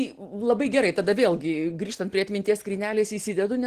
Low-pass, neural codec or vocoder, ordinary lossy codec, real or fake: 14.4 kHz; none; Opus, 16 kbps; real